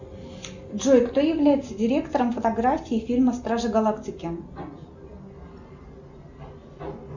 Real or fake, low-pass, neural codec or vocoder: real; 7.2 kHz; none